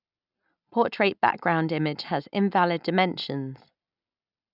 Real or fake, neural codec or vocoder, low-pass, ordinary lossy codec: real; none; 5.4 kHz; none